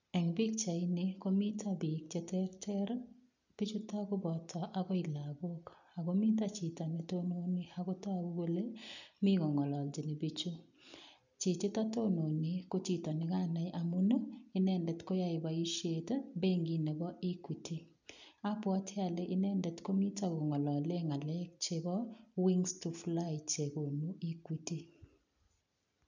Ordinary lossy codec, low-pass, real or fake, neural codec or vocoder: none; 7.2 kHz; real; none